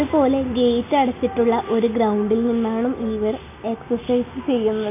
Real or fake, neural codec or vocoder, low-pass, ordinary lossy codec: real; none; 3.6 kHz; none